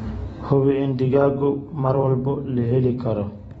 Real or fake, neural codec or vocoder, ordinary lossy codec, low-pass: fake; vocoder, 44.1 kHz, 128 mel bands every 256 samples, BigVGAN v2; AAC, 24 kbps; 19.8 kHz